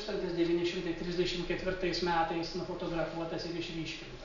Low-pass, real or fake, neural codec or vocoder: 7.2 kHz; real; none